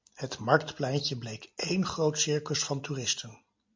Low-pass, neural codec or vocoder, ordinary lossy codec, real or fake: 7.2 kHz; none; MP3, 48 kbps; real